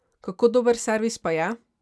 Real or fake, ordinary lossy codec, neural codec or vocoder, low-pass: real; none; none; none